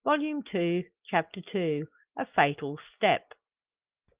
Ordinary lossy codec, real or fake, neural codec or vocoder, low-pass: Opus, 32 kbps; real; none; 3.6 kHz